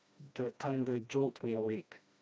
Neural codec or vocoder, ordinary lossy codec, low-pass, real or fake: codec, 16 kHz, 1 kbps, FreqCodec, smaller model; none; none; fake